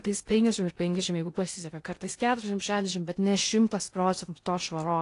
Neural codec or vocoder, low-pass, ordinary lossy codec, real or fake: codec, 16 kHz in and 24 kHz out, 0.8 kbps, FocalCodec, streaming, 65536 codes; 10.8 kHz; AAC, 48 kbps; fake